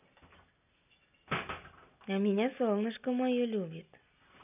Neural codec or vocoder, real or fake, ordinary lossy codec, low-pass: none; real; none; 3.6 kHz